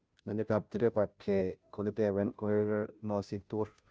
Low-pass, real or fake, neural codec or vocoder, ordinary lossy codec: none; fake; codec, 16 kHz, 0.5 kbps, FunCodec, trained on Chinese and English, 25 frames a second; none